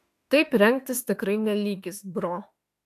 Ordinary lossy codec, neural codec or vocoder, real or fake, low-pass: AAC, 64 kbps; autoencoder, 48 kHz, 32 numbers a frame, DAC-VAE, trained on Japanese speech; fake; 14.4 kHz